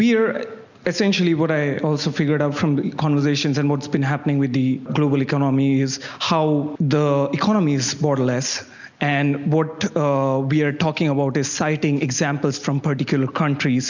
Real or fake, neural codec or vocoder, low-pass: real; none; 7.2 kHz